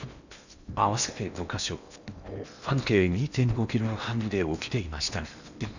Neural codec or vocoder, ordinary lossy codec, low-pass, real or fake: codec, 16 kHz in and 24 kHz out, 0.8 kbps, FocalCodec, streaming, 65536 codes; none; 7.2 kHz; fake